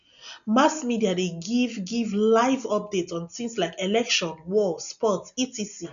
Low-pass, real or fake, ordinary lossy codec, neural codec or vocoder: 7.2 kHz; real; none; none